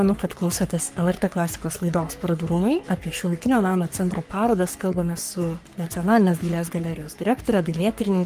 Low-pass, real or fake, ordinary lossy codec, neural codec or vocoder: 14.4 kHz; fake; Opus, 32 kbps; codec, 44.1 kHz, 3.4 kbps, Pupu-Codec